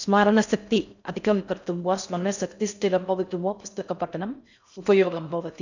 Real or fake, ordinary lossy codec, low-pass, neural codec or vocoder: fake; none; 7.2 kHz; codec, 16 kHz in and 24 kHz out, 0.6 kbps, FocalCodec, streaming, 4096 codes